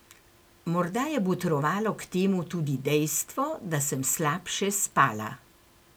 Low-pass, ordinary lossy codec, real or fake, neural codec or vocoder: none; none; real; none